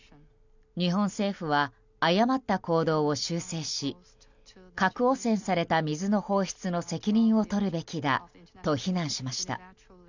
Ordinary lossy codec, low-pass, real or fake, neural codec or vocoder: none; 7.2 kHz; real; none